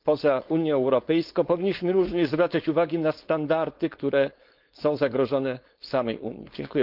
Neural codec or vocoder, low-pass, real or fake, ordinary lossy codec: codec, 16 kHz, 4.8 kbps, FACodec; 5.4 kHz; fake; Opus, 32 kbps